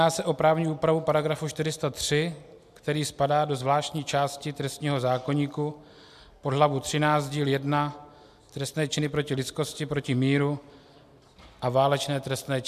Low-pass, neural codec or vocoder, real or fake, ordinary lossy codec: 14.4 kHz; none; real; AAC, 96 kbps